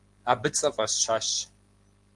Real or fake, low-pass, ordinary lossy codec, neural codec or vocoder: real; 10.8 kHz; Opus, 24 kbps; none